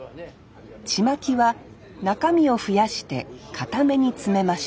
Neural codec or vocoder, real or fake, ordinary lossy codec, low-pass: none; real; none; none